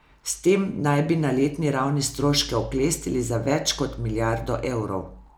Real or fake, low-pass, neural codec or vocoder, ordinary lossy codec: real; none; none; none